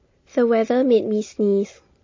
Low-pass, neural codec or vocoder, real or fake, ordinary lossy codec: 7.2 kHz; none; real; MP3, 32 kbps